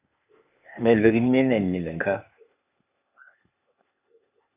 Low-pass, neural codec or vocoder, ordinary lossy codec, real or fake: 3.6 kHz; codec, 16 kHz, 0.8 kbps, ZipCodec; AAC, 32 kbps; fake